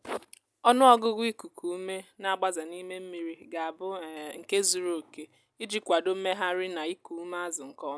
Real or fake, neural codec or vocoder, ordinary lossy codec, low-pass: real; none; none; none